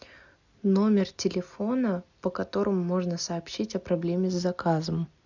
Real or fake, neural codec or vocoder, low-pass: real; none; 7.2 kHz